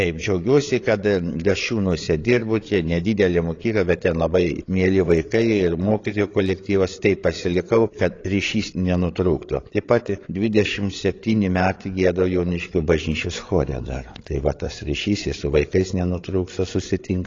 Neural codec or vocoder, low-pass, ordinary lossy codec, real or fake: codec, 16 kHz, 8 kbps, FreqCodec, larger model; 7.2 kHz; AAC, 32 kbps; fake